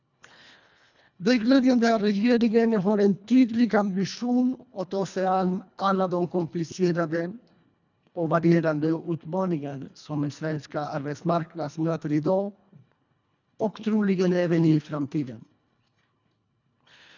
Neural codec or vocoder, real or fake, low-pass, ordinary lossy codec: codec, 24 kHz, 1.5 kbps, HILCodec; fake; 7.2 kHz; none